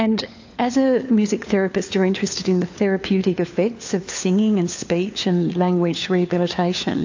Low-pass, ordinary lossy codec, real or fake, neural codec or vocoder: 7.2 kHz; AAC, 48 kbps; fake; codec, 16 kHz, 4 kbps, FunCodec, trained on LibriTTS, 50 frames a second